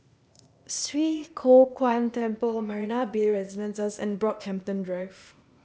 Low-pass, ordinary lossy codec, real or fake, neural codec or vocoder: none; none; fake; codec, 16 kHz, 0.8 kbps, ZipCodec